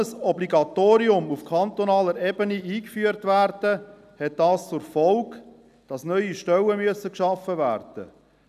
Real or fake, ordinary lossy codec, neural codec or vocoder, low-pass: real; none; none; 14.4 kHz